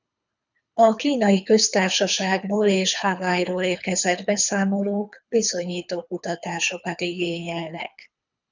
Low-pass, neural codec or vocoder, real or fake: 7.2 kHz; codec, 24 kHz, 3 kbps, HILCodec; fake